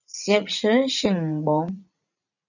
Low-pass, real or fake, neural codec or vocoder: 7.2 kHz; fake; vocoder, 44.1 kHz, 80 mel bands, Vocos